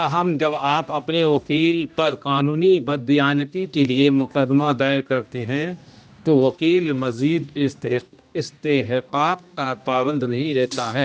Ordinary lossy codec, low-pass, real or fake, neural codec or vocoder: none; none; fake; codec, 16 kHz, 1 kbps, X-Codec, HuBERT features, trained on general audio